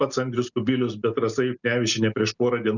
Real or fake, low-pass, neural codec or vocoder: real; 7.2 kHz; none